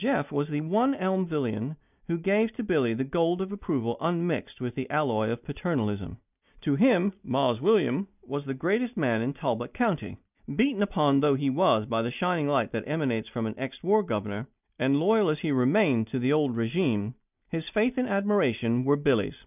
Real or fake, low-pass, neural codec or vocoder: real; 3.6 kHz; none